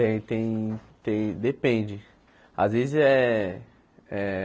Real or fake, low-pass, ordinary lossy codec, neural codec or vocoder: real; none; none; none